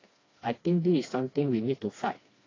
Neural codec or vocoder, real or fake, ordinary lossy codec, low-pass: codec, 16 kHz, 2 kbps, FreqCodec, smaller model; fake; AAC, 32 kbps; 7.2 kHz